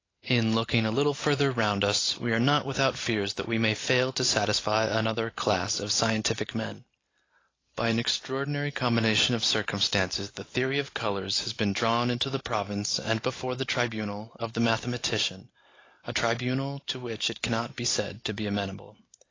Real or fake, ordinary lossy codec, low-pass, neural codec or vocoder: real; AAC, 32 kbps; 7.2 kHz; none